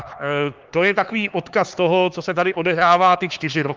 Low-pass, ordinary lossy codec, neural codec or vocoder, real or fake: 7.2 kHz; Opus, 32 kbps; codec, 16 kHz, 4 kbps, X-Codec, HuBERT features, trained on LibriSpeech; fake